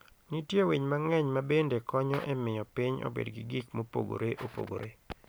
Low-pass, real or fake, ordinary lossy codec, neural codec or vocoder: none; real; none; none